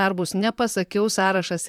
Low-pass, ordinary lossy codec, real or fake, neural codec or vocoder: 19.8 kHz; MP3, 96 kbps; fake; vocoder, 44.1 kHz, 128 mel bands, Pupu-Vocoder